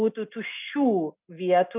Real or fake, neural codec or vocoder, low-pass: real; none; 3.6 kHz